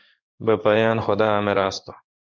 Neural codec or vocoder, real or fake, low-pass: codec, 16 kHz in and 24 kHz out, 1 kbps, XY-Tokenizer; fake; 7.2 kHz